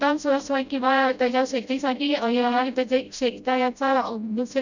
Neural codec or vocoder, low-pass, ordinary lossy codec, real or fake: codec, 16 kHz, 0.5 kbps, FreqCodec, smaller model; 7.2 kHz; none; fake